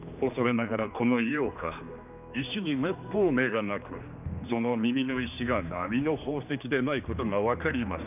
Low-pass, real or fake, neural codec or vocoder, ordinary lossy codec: 3.6 kHz; fake; codec, 16 kHz, 2 kbps, X-Codec, HuBERT features, trained on general audio; none